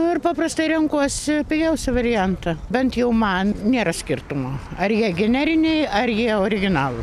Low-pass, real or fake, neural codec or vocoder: 14.4 kHz; real; none